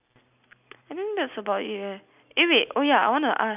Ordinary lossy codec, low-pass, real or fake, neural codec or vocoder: none; 3.6 kHz; real; none